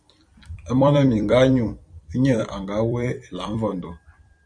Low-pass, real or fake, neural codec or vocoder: 9.9 kHz; fake; vocoder, 44.1 kHz, 128 mel bands every 256 samples, BigVGAN v2